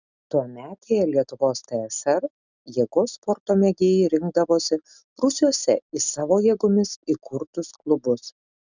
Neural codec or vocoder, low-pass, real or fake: none; 7.2 kHz; real